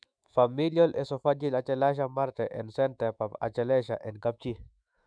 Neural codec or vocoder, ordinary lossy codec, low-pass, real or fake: autoencoder, 48 kHz, 128 numbers a frame, DAC-VAE, trained on Japanese speech; none; 9.9 kHz; fake